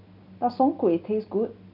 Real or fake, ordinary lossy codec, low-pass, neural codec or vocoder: real; none; 5.4 kHz; none